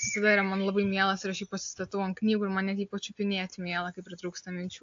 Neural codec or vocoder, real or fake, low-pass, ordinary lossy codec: none; real; 7.2 kHz; AAC, 48 kbps